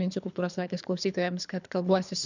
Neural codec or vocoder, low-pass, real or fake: codec, 24 kHz, 3 kbps, HILCodec; 7.2 kHz; fake